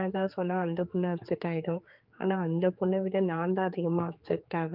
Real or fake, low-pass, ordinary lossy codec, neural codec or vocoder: fake; 5.4 kHz; Opus, 24 kbps; codec, 16 kHz, 4 kbps, X-Codec, HuBERT features, trained on general audio